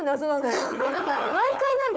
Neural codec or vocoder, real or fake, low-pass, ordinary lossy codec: codec, 16 kHz, 4 kbps, FunCodec, trained on Chinese and English, 50 frames a second; fake; none; none